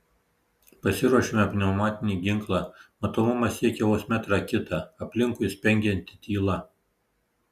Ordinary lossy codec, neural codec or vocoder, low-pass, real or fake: Opus, 64 kbps; none; 14.4 kHz; real